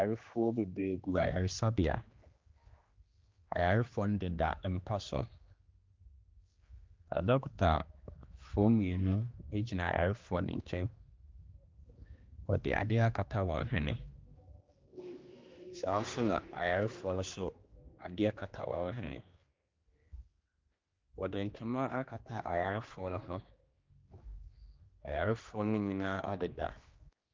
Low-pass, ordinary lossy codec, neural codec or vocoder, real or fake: 7.2 kHz; Opus, 24 kbps; codec, 16 kHz, 2 kbps, X-Codec, HuBERT features, trained on general audio; fake